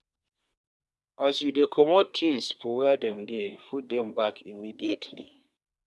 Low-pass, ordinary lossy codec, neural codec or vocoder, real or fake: none; none; codec, 24 kHz, 1 kbps, SNAC; fake